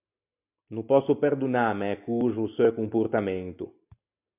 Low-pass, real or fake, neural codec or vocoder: 3.6 kHz; real; none